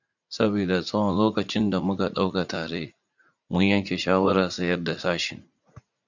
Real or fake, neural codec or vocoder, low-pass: fake; vocoder, 44.1 kHz, 80 mel bands, Vocos; 7.2 kHz